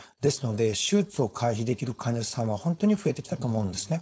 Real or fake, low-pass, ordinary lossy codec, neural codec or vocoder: fake; none; none; codec, 16 kHz, 4.8 kbps, FACodec